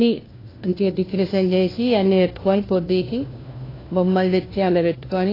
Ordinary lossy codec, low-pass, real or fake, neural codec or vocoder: AAC, 24 kbps; 5.4 kHz; fake; codec, 16 kHz, 1 kbps, FunCodec, trained on LibriTTS, 50 frames a second